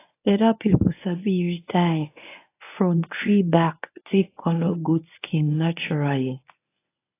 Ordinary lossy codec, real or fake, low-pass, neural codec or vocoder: AAC, 24 kbps; fake; 3.6 kHz; codec, 24 kHz, 0.9 kbps, WavTokenizer, medium speech release version 1